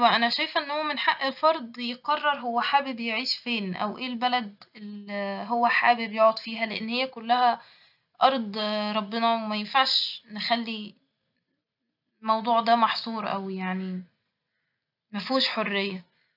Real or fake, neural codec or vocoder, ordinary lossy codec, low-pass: real; none; none; 5.4 kHz